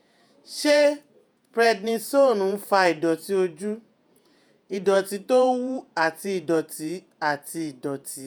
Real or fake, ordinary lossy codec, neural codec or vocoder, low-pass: fake; none; vocoder, 48 kHz, 128 mel bands, Vocos; none